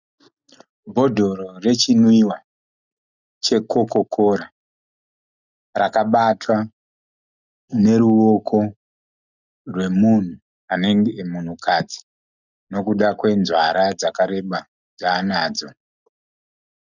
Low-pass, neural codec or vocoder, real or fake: 7.2 kHz; none; real